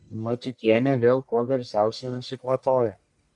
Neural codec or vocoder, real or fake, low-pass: codec, 44.1 kHz, 1.7 kbps, Pupu-Codec; fake; 10.8 kHz